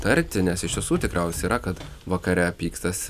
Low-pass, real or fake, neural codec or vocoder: 14.4 kHz; real; none